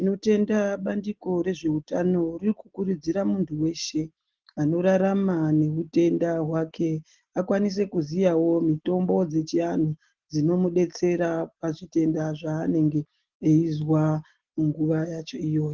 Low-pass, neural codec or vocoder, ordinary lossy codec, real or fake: 7.2 kHz; none; Opus, 16 kbps; real